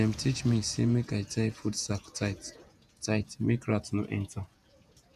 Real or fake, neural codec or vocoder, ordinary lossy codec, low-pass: fake; vocoder, 48 kHz, 128 mel bands, Vocos; none; 14.4 kHz